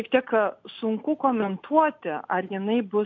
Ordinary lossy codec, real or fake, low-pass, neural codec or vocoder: MP3, 64 kbps; real; 7.2 kHz; none